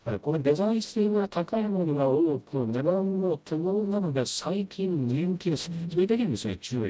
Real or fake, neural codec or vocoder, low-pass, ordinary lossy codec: fake; codec, 16 kHz, 0.5 kbps, FreqCodec, smaller model; none; none